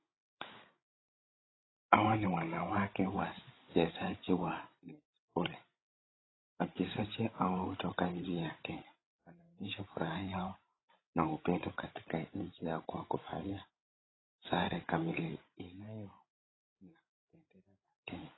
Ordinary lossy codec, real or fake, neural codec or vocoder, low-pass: AAC, 16 kbps; fake; codec, 16 kHz, 16 kbps, FreqCodec, larger model; 7.2 kHz